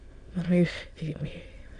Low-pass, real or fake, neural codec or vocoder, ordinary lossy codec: 9.9 kHz; fake; autoencoder, 22.05 kHz, a latent of 192 numbers a frame, VITS, trained on many speakers; AAC, 48 kbps